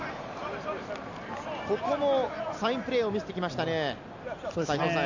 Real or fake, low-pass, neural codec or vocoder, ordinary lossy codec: real; 7.2 kHz; none; none